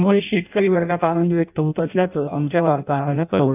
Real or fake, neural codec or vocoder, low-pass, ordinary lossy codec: fake; codec, 16 kHz in and 24 kHz out, 0.6 kbps, FireRedTTS-2 codec; 3.6 kHz; none